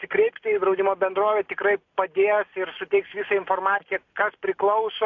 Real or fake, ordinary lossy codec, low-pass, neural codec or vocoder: real; AAC, 48 kbps; 7.2 kHz; none